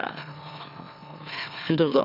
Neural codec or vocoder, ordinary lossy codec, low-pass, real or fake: autoencoder, 44.1 kHz, a latent of 192 numbers a frame, MeloTTS; none; 5.4 kHz; fake